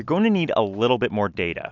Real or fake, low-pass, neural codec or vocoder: real; 7.2 kHz; none